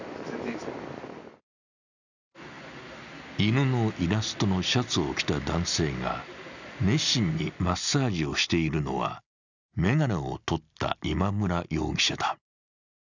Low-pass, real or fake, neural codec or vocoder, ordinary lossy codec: 7.2 kHz; real; none; none